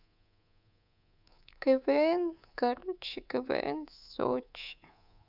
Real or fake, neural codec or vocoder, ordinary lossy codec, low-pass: fake; codec, 24 kHz, 3.1 kbps, DualCodec; none; 5.4 kHz